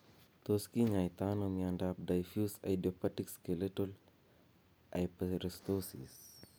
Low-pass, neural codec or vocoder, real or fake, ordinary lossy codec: none; none; real; none